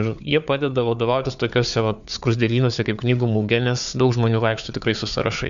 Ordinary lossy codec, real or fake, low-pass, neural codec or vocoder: MP3, 64 kbps; fake; 7.2 kHz; codec, 16 kHz, 4 kbps, FunCodec, trained on Chinese and English, 50 frames a second